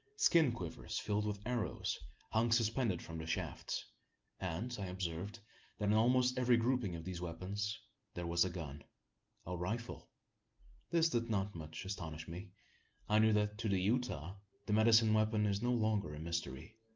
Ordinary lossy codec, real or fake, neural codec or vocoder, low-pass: Opus, 32 kbps; real; none; 7.2 kHz